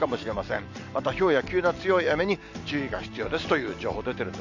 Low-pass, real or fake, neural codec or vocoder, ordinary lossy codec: 7.2 kHz; real; none; none